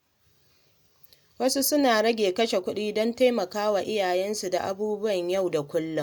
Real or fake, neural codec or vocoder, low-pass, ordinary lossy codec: real; none; none; none